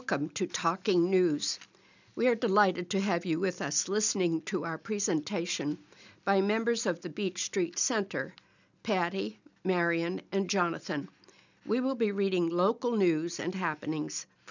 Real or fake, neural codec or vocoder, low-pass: real; none; 7.2 kHz